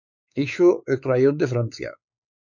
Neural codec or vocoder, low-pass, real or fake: codec, 16 kHz, 4 kbps, X-Codec, WavLM features, trained on Multilingual LibriSpeech; 7.2 kHz; fake